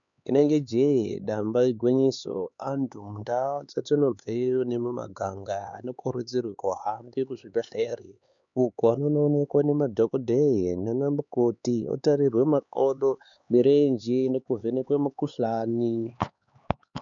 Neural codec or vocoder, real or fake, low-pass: codec, 16 kHz, 4 kbps, X-Codec, HuBERT features, trained on LibriSpeech; fake; 7.2 kHz